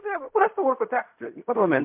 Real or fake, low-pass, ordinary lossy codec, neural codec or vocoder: fake; 3.6 kHz; MP3, 32 kbps; codec, 16 kHz in and 24 kHz out, 0.4 kbps, LongCat-Audio-Codec, fine tuned four codebook decoder